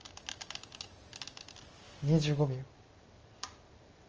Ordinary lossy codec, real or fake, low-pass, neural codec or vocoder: Opus, 24 kbps; real; 7.2 kHz; none